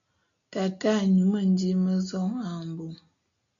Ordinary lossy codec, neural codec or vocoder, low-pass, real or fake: AAC, 64 kbps; none; 7.2 kHz; real